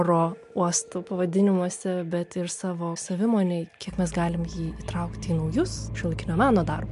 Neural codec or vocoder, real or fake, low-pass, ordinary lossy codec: none; real; 10.8 kHz; MP3, 64 kbps